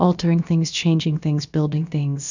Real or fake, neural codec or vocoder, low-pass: fake; codec, 16 kHz, about 1 kbps, DyCAST, with the encoder's durations; 7.2 kHz